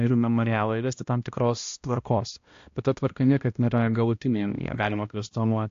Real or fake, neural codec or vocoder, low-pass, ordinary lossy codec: fake; codec, 16 kHz, 1 kbps, X-Codec, HuBERT features, trained on balanced general audio; 7.2 kHz; AAC, 48 kbps